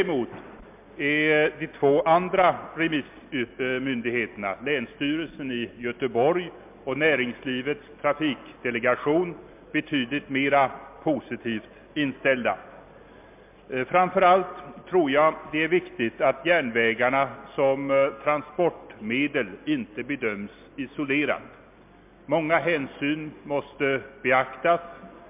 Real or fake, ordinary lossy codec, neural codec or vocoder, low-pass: real; MP3, 32 kbps; none; 3.6 kHz